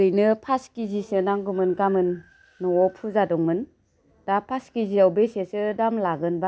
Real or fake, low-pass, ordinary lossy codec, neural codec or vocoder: real; none; none; none